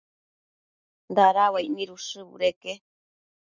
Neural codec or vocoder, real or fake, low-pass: none; real; 7.2 kHz